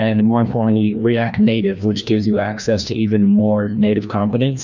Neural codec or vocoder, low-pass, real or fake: codec, 16 kHz, 1 kbps, FreqCodec, larger model; 7.2 kHz; fake